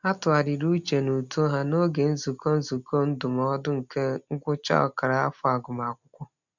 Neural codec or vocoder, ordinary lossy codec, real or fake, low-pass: none; none; real; 7.2 kHz